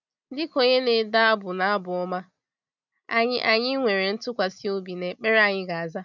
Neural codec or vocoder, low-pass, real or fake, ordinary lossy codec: none; 7.2 kHz; real; none